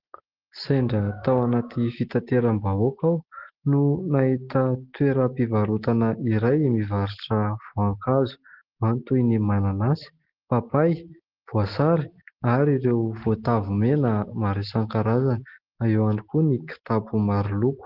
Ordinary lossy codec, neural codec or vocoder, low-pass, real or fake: Opus, 16 kbps; none; 5.4 kHz; real